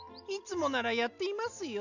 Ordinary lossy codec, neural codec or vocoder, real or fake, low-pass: none; none; real; 7.2 kHz